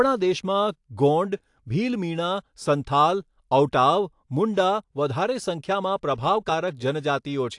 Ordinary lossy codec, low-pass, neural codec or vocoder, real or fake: AAC, 64 kbps; 10.8 kHz; none; real